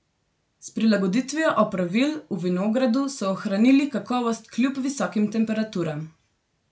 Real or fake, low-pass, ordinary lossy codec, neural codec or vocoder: real; none; none; none